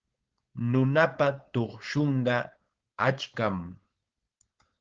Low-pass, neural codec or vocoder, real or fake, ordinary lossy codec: 7.2 kHz; codec, 16 kHz, 4.8 kbps, FACodec; fake; Opus, 16 kbps